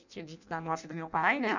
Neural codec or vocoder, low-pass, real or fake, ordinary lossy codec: codec, 16 kHz in and 24 kHz out, 0.6 kbps, FireRedTTS-2 codec; 7.2 kHz; fake; none